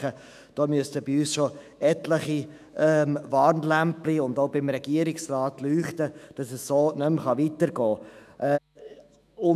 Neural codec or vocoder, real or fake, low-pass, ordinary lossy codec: autoencoder, 48 kHz, 128 numbers a frame, DAC-VAE, trained on Japanese speech; fake; 14.4 kHz; none